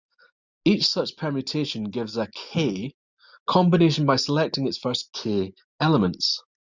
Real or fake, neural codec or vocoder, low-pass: real; none; 7.2 kHz